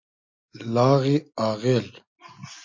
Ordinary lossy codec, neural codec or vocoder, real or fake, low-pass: MP3, 48 kbps; none; real; 7.2 kHz